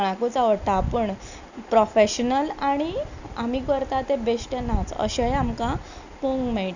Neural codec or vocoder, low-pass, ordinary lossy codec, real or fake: none; 7.2 kHz; none; real